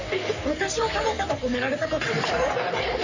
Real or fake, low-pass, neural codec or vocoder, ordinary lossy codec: fake; 7.2 kHz; codec, 44.1 kHz, 3.4 kbps, Pupu-Codec; Opus, 64 kbps